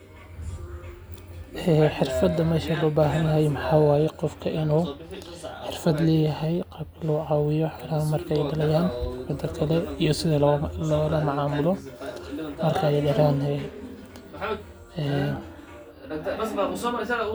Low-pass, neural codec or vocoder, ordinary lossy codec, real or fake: none; none; none; real